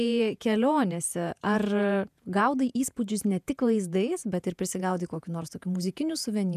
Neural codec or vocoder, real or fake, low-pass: vocoder, 48 kHz, 128 mel bands, Vocos; fake; 14.4 kHz